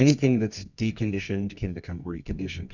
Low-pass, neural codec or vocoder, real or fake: 7.2 kHz; codec, 24 kHz, 0.9 kbps, WavTokenizer, medium music audio release; fake